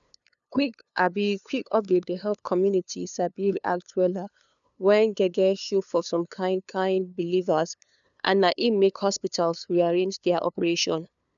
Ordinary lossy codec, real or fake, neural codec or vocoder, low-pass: none; fake; codec, 16 kHz, 2 kbps, FunCodec, trained on LibriTTS, 25 frames a second; 7.2 kHz